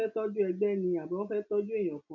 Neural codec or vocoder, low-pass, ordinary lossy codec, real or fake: none; 7.2 kHz; none; real